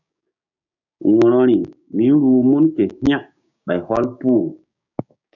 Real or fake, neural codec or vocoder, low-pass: fake; codec, 16 kHz, 6 kbps, DAC; 7.2 kHz